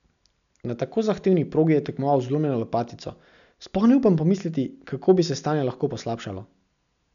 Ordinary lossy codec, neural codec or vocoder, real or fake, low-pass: none; none; real; 7.2 kHz